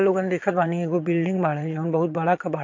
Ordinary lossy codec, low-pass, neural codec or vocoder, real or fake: MP3, 48 kbps; 7.2 kHz; vocoder, 22.05 kHz, 80 mel bands, Vocos; fake